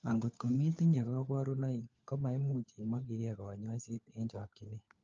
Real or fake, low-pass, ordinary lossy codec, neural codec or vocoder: fake; 7.2 kHz; Opus, 24 kbps; codec, 16 kHz, 4 kbps, FunCodec, trained on LibriTTS, 50 frames a second